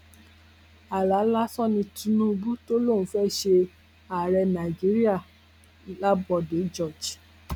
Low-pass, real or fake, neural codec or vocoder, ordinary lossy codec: 19.8 kHz; real; none; none